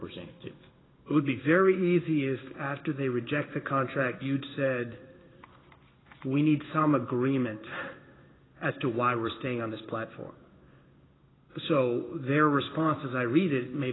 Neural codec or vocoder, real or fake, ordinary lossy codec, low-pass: codec, 16 kHz in and 24 kHz out, 1 kbps, XY-Tokenizer; fake; AAC, 16 kbps; 7.2 kHz